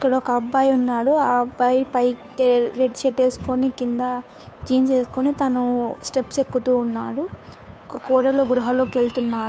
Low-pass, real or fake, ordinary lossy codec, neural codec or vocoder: none; fake; none; codec, 16 kHz, 2 kbps, FunCodec, trained on Chinese and English, 25 frames a second